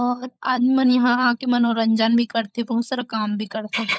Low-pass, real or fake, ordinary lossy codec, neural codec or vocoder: none; fake; none; codec, 16 kHz, 16 kbps, FunCodec, trained on LibriTTS, 50 frames a second